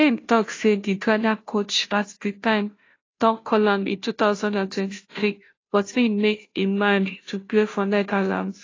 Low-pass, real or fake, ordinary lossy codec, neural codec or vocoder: 7.2 kHz; fake; AAC, 32 kbps; codec, 16 kHz, 0.5 kbps, FunCodec, trained on Chinese and English, 25 frames a second